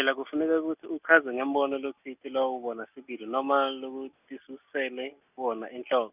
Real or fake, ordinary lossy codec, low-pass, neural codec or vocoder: real; none; 3.6 kHz; none